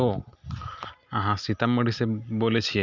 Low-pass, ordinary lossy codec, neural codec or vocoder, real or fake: 7.2 kHz; Opus, 64 kbps; none; real